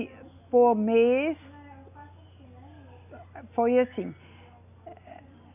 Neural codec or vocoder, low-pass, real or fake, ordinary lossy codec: none; 3.6 kHz; real; none